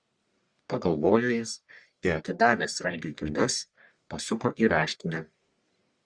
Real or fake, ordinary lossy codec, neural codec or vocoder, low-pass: fake; Opus, 64 kbps; codec, 44.1 kHz, 1.7 kbps, Pupu-Codec; 9.9 kHz